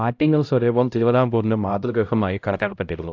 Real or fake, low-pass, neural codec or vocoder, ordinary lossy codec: fake; 7.2 kHz; codec, 16 kHz, 0.5 kbps, X-Codec, HuBERT features, trained on LibriSpeech; AAC, 48 kbps